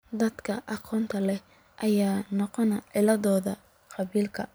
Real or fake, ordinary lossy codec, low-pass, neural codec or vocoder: fake; none; none; vocoder, 44.1 kHz, 128 mel bands every 256 samples, BigVGAN v2